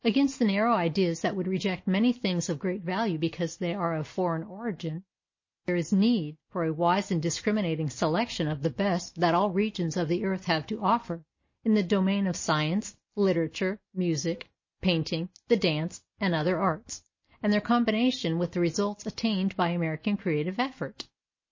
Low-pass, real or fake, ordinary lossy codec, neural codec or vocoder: 7.2 kHz; real; MP3, 32 kbps; none